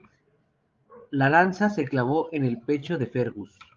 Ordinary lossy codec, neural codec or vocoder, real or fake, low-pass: Opus, 32 kbps; codec, 16 kHz, 16 kbps, FreqCodec, larger model; fake; 7.2 kHz